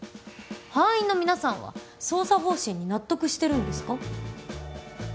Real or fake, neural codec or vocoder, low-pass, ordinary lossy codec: real; none; none; none